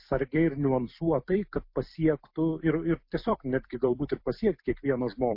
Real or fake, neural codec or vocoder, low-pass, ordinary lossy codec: fake; vocoder, 44.1 kHz, 128 mel bands every 256 samples, BigVGAN v2; 5.4 kHz; MP3, 32 kbps